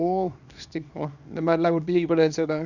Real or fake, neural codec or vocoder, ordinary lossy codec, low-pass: fake; codec, 24 kHz, 0.9 kbps, WavTokenizer, small release; none; 7.2 kHz